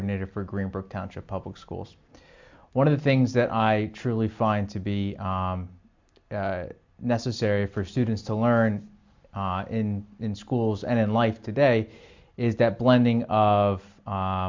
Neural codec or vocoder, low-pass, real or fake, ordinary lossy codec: none; 7.2 kHz; real; MP3, 64 kbps